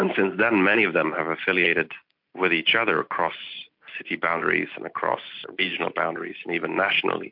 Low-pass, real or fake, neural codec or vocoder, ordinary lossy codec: 5.4 kHz; real; none; MP3, 48 kbps